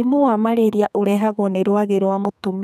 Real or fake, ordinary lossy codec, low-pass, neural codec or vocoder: fake; none; 14.4 kHz; codec, 32 kHz, 1.9 kbps, SNAC